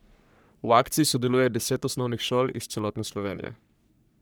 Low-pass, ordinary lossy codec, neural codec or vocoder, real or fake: none; none; codec, 44.1 kHz, 3.4 kbps, Pupu-Codec; fake